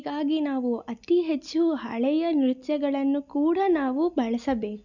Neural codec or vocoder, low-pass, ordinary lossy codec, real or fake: none; 7.2 kHz; none; real